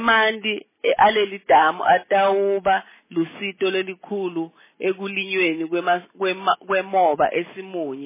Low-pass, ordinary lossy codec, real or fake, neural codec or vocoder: 3.6 kHz; MP3, 16 kbps; real; none